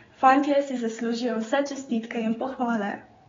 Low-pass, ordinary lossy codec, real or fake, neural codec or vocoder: 7.2 kHz; AAC, 24 kbps; fake; codec, 16 kHz, 4 kbps, X-Codec, HuBERT features, trained on general audio